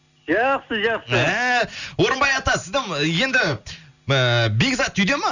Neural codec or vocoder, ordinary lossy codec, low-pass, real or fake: none; none; 7.2 kHz; real